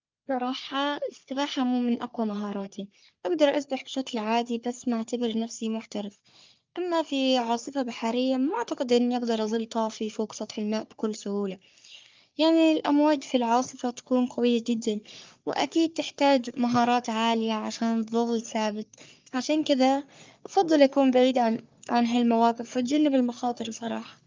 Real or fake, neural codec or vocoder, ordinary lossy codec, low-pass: fake; codec, 44.1 kHz, 3.4 kbps, Pupu-Codec; Opus, 32 kbps; 7.2 kHz